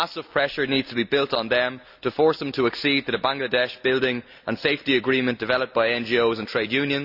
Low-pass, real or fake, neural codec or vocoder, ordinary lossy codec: 5.4 kHz; real; none; none